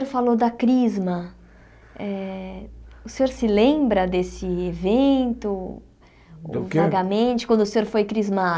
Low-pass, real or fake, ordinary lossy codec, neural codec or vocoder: none; real; none; none